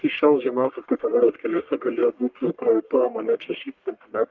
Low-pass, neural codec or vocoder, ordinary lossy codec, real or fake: 7.2 kHz; codec, 44.1 kHz, 1.7 kbps, Pupu-Codec; Opus, 32 kbps; fake